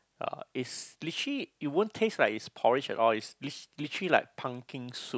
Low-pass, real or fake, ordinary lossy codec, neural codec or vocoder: none; real; none; none